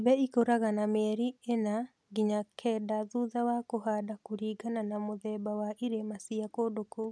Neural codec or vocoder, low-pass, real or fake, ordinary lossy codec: none; none; real; none